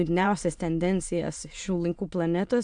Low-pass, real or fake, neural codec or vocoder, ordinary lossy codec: 9.9 kHz; fake; autoencoder, 22.05 kHz, a latent of 192 numbers a frame, VITS, trained on many speakers; AAC, 64 kbps